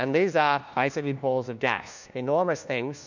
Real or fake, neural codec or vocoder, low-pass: fake; codec, 16 kHz, 1 kbps, FunCodec, trained on LibriTTS, 50 frames a second; 7.2 kHz